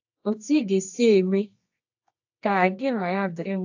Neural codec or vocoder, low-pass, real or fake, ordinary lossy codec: codec, 24 kHz, 0.9 kbps, WavTokenizer, medium music audio release; 7.2 kHz; fake; AAC, 48 kbps